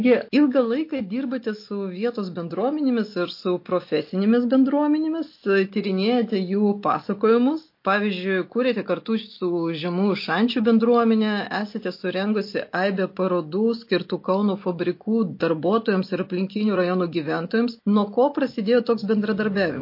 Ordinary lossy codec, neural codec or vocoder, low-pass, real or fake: MP3, 32 kbps; none; 5.4 kHz; real